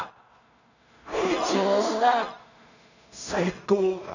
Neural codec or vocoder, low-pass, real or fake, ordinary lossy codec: codec, 16 kHz in and 24 kHz out, 0.4 kbps, LongCat-Audio-Codec, two codebook decoder; 7.2 kHz; fake; none